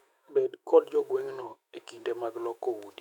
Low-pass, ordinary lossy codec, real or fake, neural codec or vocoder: 19.8 kHz; none; fake; autoencoder, 48 kHz, 128 numbers a frame, DAC-VAE, trained on Japanese speech